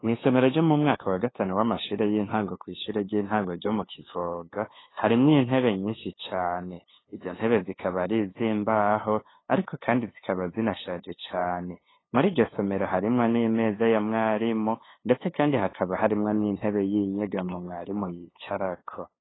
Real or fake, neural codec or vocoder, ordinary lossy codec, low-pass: fake; codec, 16 kHz, 2 kbps, FunCodec, trained on LibriTTS, 25 frames a second; AAC, 16 kbps; 7.2 kHz